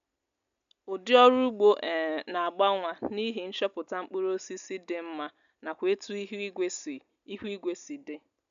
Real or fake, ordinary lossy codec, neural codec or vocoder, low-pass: real; none; none; 7.2 kHz